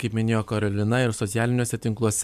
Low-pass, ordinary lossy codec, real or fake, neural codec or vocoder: 14.4 kHz; MP3, 96 kbps; fake; vocoder, 44.1 kHz, 128 mel bands every 256 samples, BigVGAN v2